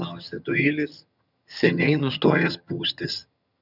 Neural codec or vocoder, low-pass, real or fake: vocoder, 22.05 kHz, 80 mel bands, HiFi-GAN; 5.4 kHz; fake